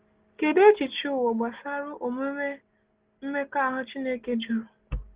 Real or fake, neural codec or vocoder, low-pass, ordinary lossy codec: real; none; 3.6 kHz; Opus, 16 kbps